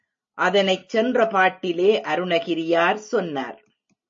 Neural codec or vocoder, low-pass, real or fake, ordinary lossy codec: none; 7.2 kHz; real; MP3, 32 kbps